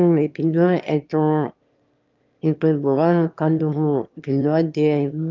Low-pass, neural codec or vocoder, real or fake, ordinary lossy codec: 7.2 kHz; autoencoder, 22.05 kHz, a latent of 192 numbers a frame, VITS, trained on one speaker; fake; Opus, 24 kbps